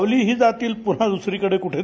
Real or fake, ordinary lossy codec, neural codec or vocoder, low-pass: real; none; none; none